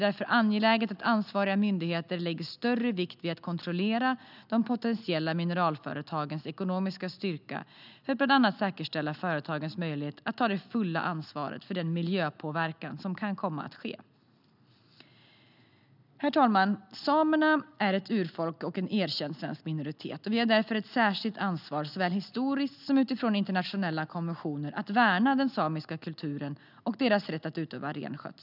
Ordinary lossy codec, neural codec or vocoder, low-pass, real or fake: none; none; 5.4 kHz; real